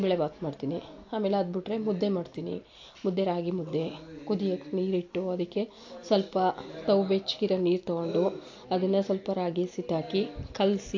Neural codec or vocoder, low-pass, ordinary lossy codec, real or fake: none; 7.2 kHz; AAC, 48 kbps; real